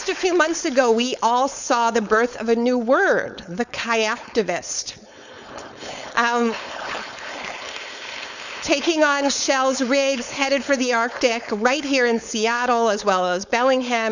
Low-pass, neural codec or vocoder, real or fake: 7.2 kHz; codec, 16 kHz, 4.8 kbps, FACodec; fake